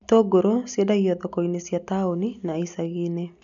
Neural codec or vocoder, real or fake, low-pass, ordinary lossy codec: none; real; 7.2 kHz; none